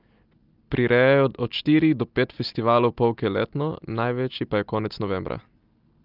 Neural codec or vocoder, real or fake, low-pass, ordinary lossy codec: none; real; 5.4 kHz; Opus, 32 kbps